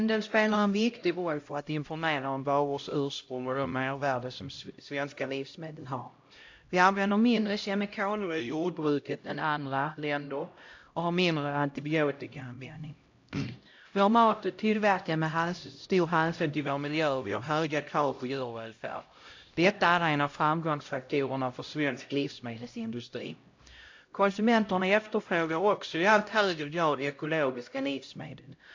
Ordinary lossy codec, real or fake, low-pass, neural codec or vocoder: AAC, 48 kbps; fake; 7.2 kHz; codec, 16 kHz, 0.5 kbps, X-Codec, HuBERT features, trained on LibriSpeech